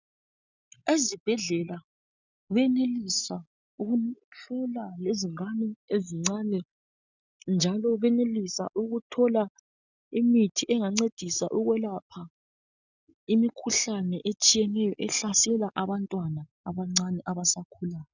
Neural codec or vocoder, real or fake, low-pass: none; real; 7.2 kHz